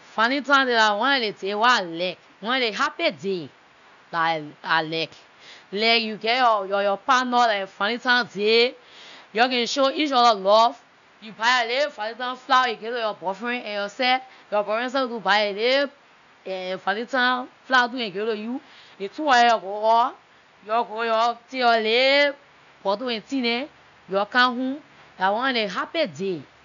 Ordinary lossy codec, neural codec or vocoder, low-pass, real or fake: none; none; 7.2 kHz; real